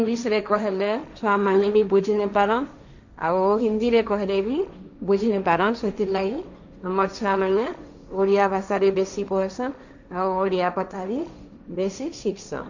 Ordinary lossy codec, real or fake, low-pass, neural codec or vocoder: none; fake; 7.2 kHz; codec, 16 kHz, 1.1 kbps, Voila-Tokenizer